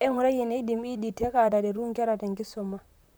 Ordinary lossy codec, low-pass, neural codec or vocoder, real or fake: none; none; vocoder, 44.1 kHz, 128 mel bands, Pupu-Vocoder; fake